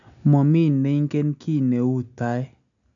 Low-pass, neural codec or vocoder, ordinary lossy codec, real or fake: 7.2 kHz; none; none; real